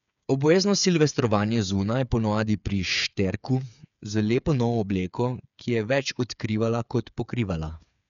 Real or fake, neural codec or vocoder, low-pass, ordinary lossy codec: fake; codec, 16 kHz, 8 kbps, FreqCodec, smaller model; 7.2 kHz; none